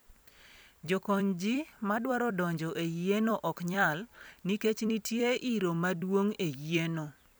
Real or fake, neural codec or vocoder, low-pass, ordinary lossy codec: fake; vocoder, 44.1 kHz, 128 mel bands every 256 samples, BigVGAN v2; none; none